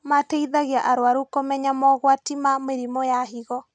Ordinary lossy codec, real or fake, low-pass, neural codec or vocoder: none; real; 9.9 kHz; none